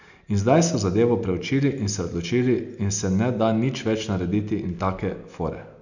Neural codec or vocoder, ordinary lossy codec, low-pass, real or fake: none; none; 7.2 kHz; real